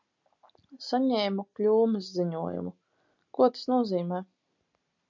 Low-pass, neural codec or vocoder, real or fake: 7.2 kHz; none; real